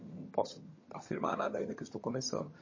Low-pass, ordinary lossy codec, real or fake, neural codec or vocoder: 7.2 kHz; MP3, 32 kbps; fake; vocoder, 22.05 kHz, 80 mel bands, HiFi-GAN